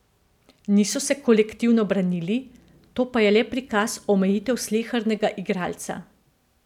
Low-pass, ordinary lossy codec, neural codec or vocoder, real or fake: 19.8 kHz; none; none; real